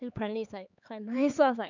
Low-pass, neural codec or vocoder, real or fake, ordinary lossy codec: 7.2 kHz; codec, 16 kHz, 4 kbps, X-Codec, HuBERT features, trained on LibriSpeech; fake; none